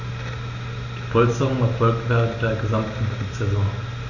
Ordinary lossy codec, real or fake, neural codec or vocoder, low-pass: none; real; none; 7.2 kHz